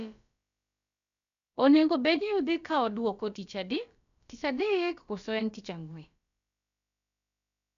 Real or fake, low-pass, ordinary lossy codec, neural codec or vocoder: fake; 7.2 kHz; none; codec, 16 kHz, about 1 kbps, DyCAST, with the encoder's durations